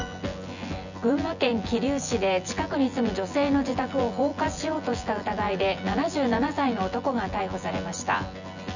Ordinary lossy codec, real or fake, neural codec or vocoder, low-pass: none; fake; vocoder, 24 kHz, 100 mel bands, Vocos; 7.2 kHz